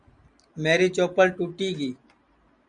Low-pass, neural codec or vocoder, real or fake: 9.9 kHz; none; real